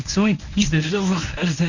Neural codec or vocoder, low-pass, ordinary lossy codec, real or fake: codec, 24 kHz, 0.9 kbps, WavTokenizer, medium speech release version 1; 7.2 kHz; none; fake